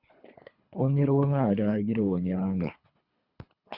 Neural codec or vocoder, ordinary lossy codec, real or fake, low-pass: codec, 24 kHz, 3 kbps, HILCodec; Opus, 64 kbps; fake; 5.4 kHz